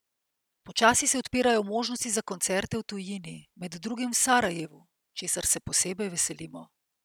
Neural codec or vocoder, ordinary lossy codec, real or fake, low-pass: none; none; real; none